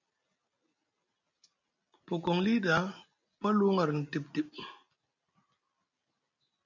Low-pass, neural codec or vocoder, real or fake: 7.2 kHz; none; real